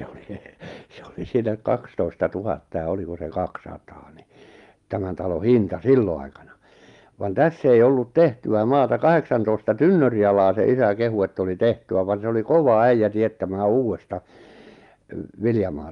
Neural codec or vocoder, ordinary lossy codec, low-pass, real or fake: none; Opus, 32 kbps; 10.8 kHz; real